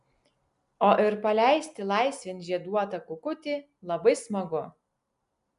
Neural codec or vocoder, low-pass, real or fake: none; 10.8 kHz; real